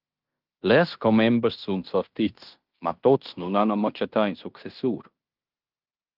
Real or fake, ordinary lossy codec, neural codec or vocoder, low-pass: fake; Opus, 32 kbps; codec, 16 kHz in and 24 kHz out, 0.9 kbps, LongCat-Audio-Codec, fine tuned four codebook decoder; 5.4 kHz